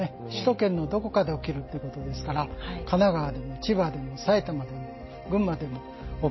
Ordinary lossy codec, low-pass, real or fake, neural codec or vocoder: MP3, 24 kbps; 7.2 kHz; real; none